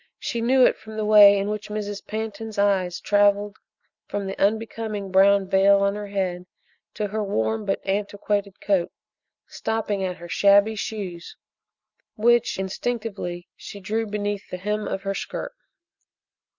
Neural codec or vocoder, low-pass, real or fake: none; 7.2 kHz; real